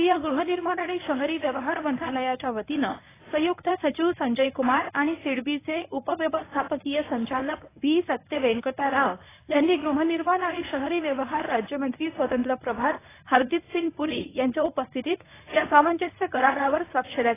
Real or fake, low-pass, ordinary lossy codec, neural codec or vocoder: fake; 3.6 kHz; AAC, 16 kbps; codec, 24 kHz, 0.9 kbps, WavTokenizer, medium speech release version 1